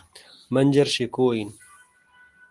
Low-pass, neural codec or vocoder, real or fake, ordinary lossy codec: 10.8 kHz; none; real; Opus, 24 kbps